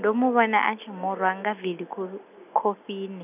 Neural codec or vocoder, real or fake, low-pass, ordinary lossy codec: none; real; 3.6 kHz; none